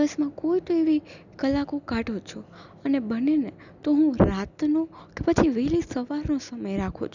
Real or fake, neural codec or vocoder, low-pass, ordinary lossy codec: real; none; 7.2 kHz; none